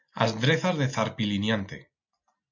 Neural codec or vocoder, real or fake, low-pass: none; real; 7.2 kHz